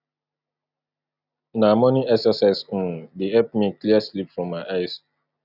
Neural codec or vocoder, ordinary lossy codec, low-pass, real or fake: none; none; 5.4 kHz; real